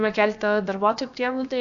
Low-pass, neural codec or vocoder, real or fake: 7.2 kHz; codec, 16 kHz, about 1 kbps, DyCAST, with the encoder's durations; fake